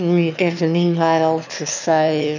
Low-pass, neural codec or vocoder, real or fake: 7.2 kHz; autoencoder, 22.05 kHz, a latent of 192 numbers a frame, VITS, trained on one speaker; fake